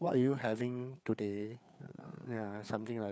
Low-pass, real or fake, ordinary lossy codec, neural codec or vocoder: none; fake; none; codec, 16 kHz, 4 kbps, FreqCodec, larger model